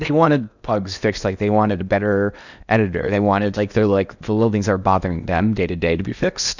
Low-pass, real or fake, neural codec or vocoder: 7.2 kHz; fake; codec, 16 kHz in and 24 kHz out, 0.8 kbps, FocalCodec, streaming, 65536 codes